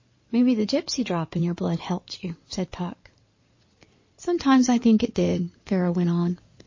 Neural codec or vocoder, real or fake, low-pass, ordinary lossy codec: codec, 16 kHz in and 24 kHz out, 2.2 kbps, FireRedTTS-2 codec; fake; 7.2 kHz; MP3, 32 kbps